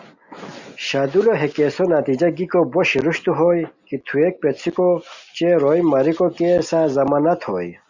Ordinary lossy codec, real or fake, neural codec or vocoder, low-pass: Opus, 64 kbps; real; none; 7.2 kHz